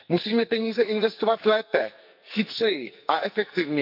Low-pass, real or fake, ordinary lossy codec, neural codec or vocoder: 5.4 kHz; fake; none; codec, 44.1 kHz, 2.6 kbps, SNAC